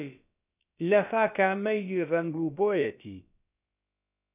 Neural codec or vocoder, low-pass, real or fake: codec, 16 kHz, about 1 kbps, DyCAST, with the encoder's durations; 3.6 kHz; fake